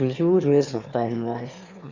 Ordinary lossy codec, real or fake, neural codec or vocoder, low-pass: Opus, 64 kbps; fake; autoencoder, 22.05 kHz, a latent of 192 numbers a frame, VITS, trained on one speaker; 7.2 kHz